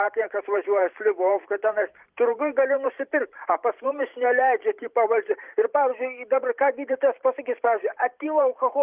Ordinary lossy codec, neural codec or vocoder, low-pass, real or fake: Opus, 24 kbps; vocoder, 44.1 kHz, 128 mel bands every 512 samples, BigVGAN v2; 3.6 kHz; fake